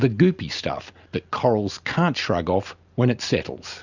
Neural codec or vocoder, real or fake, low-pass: none; real; 7.2 kHz